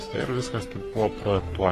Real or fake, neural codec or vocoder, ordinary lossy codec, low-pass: fake; codec, 44.1 kHz, 3.4 kbps, Pupu-Codec; AAC, 48 kbps; 14.4 kHz